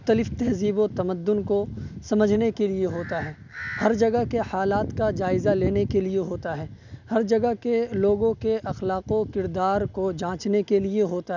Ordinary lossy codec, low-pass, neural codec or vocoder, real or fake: none; 7.2 kHz; none; real